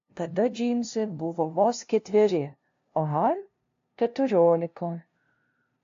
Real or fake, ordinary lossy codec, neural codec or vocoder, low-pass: fake; AAC, 48 kbps; codec, 16 kHz, 0.5 kbps, FunCodec, trained on LibriTTS, 25 frames a second; 7.2 kHz